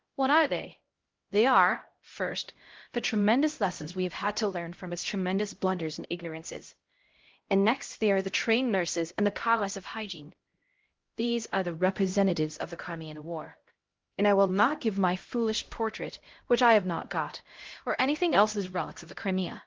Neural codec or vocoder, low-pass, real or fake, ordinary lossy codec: codec, 16 kHz, 0.5 kbps, X-Codec, HuBERT features, trained on LibriSpeech; 7.2 kHz; fake; Opus, 16 kbps